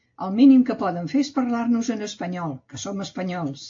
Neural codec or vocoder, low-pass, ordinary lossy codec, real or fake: none; 7.2 kHz; AAC, 48 kbps; real